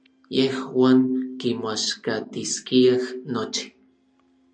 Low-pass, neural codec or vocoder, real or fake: 9.9 kHz; none; real